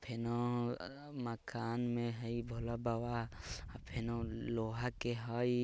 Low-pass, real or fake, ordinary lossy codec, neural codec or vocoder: none; real; none; none